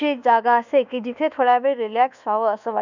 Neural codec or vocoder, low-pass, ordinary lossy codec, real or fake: codec, 24 kHz, 1.2 kbps, DualCodec; 7.2 kHz; none; fake